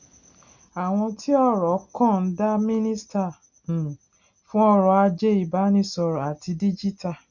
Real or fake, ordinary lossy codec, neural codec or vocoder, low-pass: real; none; none; 7.2 kHz